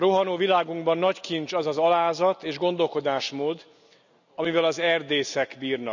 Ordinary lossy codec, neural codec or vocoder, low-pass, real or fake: none; none; 7.2 kHz; real